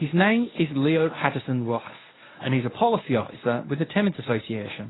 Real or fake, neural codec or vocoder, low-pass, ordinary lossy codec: fake; codec, 24 kHz, 0.9 kbps, WavTokenizer, medium speech release version 1; 7.2 kHz; AAC, 16 kbps